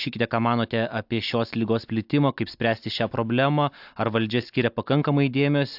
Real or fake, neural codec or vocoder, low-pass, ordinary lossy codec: real; none; 5.4 kHz; AAC, 48 kbps